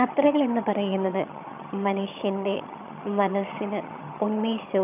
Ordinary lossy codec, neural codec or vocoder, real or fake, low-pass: none; vocoder, 22.05 kHz, 80 mel bands, HiFi-GAN; fake; 3.6 kHz